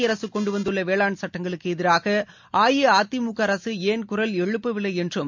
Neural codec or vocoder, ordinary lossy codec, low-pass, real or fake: none; MP3, 64 kbps; 7.2 kHz; real